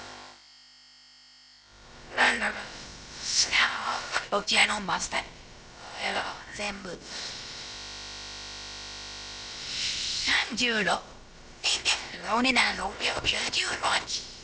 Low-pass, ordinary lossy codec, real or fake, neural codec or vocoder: none; none; fake; codec, 16 kHz, about 1 kbps, DyCAST, with the encoder's durations